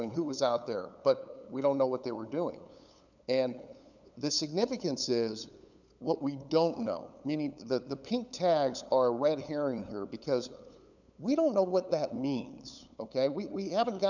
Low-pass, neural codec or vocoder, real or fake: 7.2 kHz; codec, 16 kHz, 8 kbps, FunCodec, trained on LibriTTS, 25 frames a second; fake